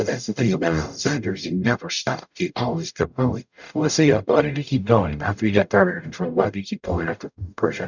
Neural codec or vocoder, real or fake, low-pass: codec, 44.1 kHz, 0.9 kbps, DAC; fake; 7.2 kHz